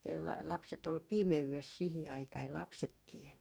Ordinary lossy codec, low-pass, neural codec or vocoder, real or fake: none; none; codec, 44.1 kHz, 2.6 kbps, DAC; fake